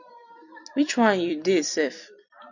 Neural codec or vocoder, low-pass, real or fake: vocoder, 44.1 kHz, 128 mel bands every 256 samples, BigVGAN v2; 7.2 kHz; fake